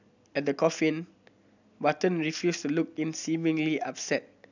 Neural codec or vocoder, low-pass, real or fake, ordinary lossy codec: none; 7.2 kHz; real; none